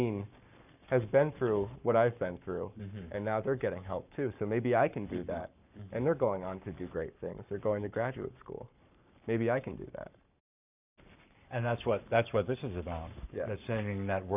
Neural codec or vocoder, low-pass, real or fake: codec, 44.1 kHz, 7.8 kbps, Pupu-Codec; 3.6 kHz; fake